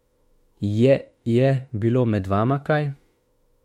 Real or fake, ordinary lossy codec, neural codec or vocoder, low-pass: fake; MP3, 64 kbps; autoencoder, 48 kHz, 32 numbers a frame, DAC-VAE, trained on Japanese speech; 19.8 kHz